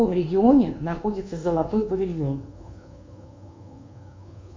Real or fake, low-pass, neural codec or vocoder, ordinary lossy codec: fake; 7.2 kHz; codec, 24 kHz, 1.2 kbps, DualCodec; MP3, 48 kbps